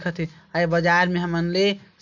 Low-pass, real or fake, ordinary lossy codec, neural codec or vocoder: 7.2 kHz; real; none; none